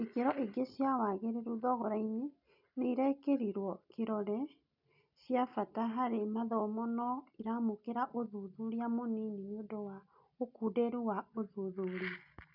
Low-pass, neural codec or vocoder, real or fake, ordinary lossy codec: 5.4 kHz; none; real; none